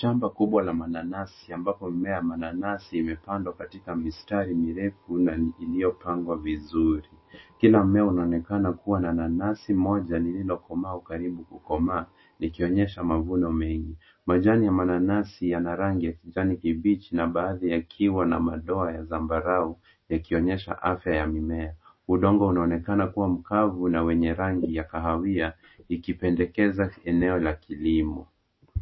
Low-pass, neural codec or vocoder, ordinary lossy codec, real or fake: 7.2 kHz; none; MP3, 24 kbps; real